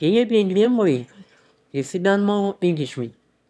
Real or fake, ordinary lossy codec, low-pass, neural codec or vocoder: fake; none; none; autoencoder, 22.05 kHz, a latent of 192 numbers a frame, VITS, trained on one speaker